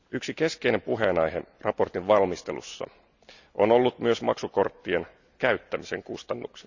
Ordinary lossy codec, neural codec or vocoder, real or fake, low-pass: none; none; real; 7.2 kHz